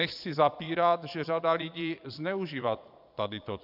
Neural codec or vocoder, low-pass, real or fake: vocoder, 22.05 kHz, 80 mel bands, Vocos; 5.4 kHz; fake